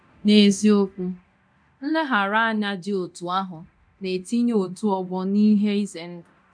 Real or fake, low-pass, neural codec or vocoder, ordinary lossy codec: fake; 9.9 kHz; codec, 24 kHz, 0.9 kbps, DualCodec; none